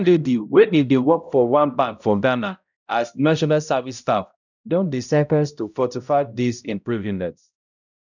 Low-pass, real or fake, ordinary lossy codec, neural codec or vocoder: 7.2 kHz; fake; none; codec, 16 kHz, 0.5 kbps, X-Codec, HuBERT features, trained on balanced general audio